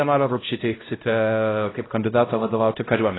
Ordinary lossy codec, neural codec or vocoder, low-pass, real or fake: AAC, 16 kbps; codec, 16 kHz, 0.5 kbps, X-Codec, HuBERT features, trained on LibriSpeech; 7.2 kHz; fake